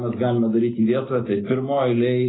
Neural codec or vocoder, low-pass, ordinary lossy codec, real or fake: none; 7.2 kHz; AAC, 16 kbps; real